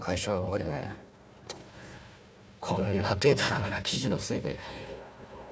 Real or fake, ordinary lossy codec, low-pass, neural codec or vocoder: fake; none; none; codec, 16 kHz, 1 kbps, FunCodec, trained on Chinese and English, 50 frames a second